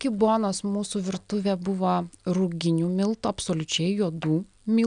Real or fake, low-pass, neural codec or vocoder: real; 9.9 kHz; none